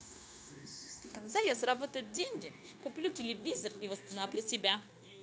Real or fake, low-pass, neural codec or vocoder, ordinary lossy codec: fake; none; codec, 16 kHz, 0.9 kbps, LongCat-Audio-Codec; none